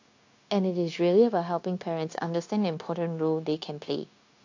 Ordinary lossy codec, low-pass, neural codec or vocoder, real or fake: AAC, 48 kbps; 7.2 kHz; codec, 16 kHz, 0.9 kbps, LongCat-Audio-Codec; fake